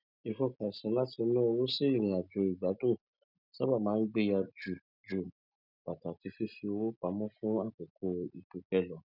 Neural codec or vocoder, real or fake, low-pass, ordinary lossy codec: none; real; 5.4 kHz; none